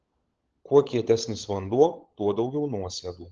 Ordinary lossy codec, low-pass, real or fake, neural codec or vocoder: Opus, 16 kbps; 7.2 kHz; fake; codec, 16 kHz, 16 kbps, FunCodec, trained on LibriTTS, 50 frames a second